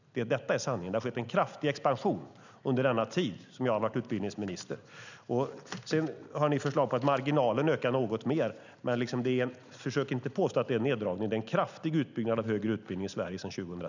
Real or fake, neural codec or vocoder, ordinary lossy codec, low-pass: real; none; none; 7.2 kHz